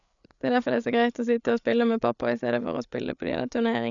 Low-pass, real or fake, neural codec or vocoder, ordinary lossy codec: 7.2 kHz; fake; codec, 16 kHz, 8 kbps, FreqCodec, larger model; none